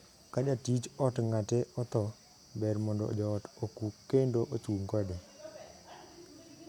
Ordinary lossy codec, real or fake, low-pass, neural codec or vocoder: none; real; 19.8 kHz; none